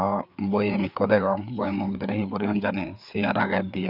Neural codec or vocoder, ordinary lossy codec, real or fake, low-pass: codec, 16 kHz, 4 kbps, FreqCodec, larger model; none; fake; 5.4 kHz